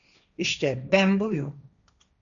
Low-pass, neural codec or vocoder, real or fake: 7.2 kHz; codec, 16 kHz, 1.1 kbps, Voila-Tokenizer; fake